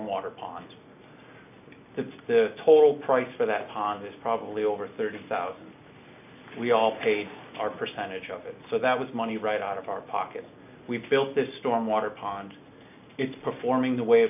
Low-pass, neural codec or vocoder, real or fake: 3.6 kHz; none; real